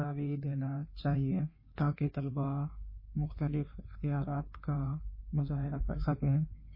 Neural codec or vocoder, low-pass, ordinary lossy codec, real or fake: codec, 16 kHz in and 24 kHz out, 1.1 kbps, FireRedTTS-2 codec; 5.4 kHz; MP3, 24 kbps; fake